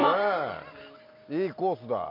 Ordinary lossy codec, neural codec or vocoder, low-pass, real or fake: MP3, 48 kbps; none; 5.4 kHz; real